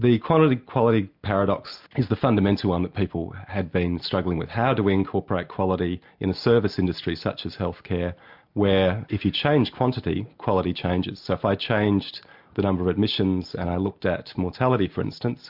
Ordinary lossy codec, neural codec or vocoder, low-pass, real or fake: MP3, 48 kbps; none; 5.4 kHz; real